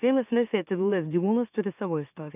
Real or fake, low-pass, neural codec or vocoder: fake; 3.6 kHz; autoencoder, 44.1 kHz, a latent of 192 numbers a frame, MeloTTS